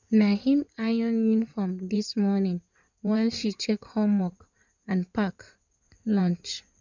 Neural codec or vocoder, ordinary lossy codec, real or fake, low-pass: codec, 16 kHz in and 24 kHz out, 2.2 kbps, FireRedTTS-2 codec; none; fake; 7.2 kHz